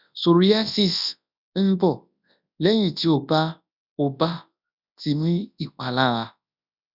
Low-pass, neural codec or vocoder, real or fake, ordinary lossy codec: 5.4 kHz; codec, 24 kHz, 0.9 kbps, WavTokenizer, large speech release; fake; none